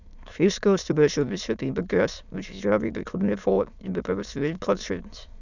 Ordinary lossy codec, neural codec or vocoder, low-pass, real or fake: none; autoencoder, 22.05 kHz, a latent of 192 numbers a frame, VITS, trained on many speakers; 7.2 kHz; fake